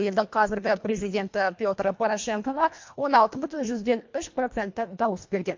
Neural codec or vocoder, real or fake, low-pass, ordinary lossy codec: codec, 24 kHz, 1.5 kbps, HILCodec; fake; 7.2 kHz; MP3, 48 kbps